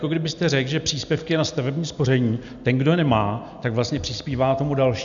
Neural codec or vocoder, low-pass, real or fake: none; 7.2 kHz; real